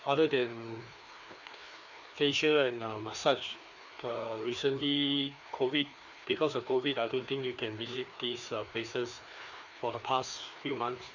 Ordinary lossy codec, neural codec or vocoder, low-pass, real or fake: none; codec, 16 kHz, 2 kbps, FreqCodec, larger model; 7.2 kHz; fake